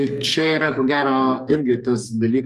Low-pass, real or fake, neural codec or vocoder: 14.4 kHz; fake; codec, 44.1 kHz, 2.6 kbps, SNAC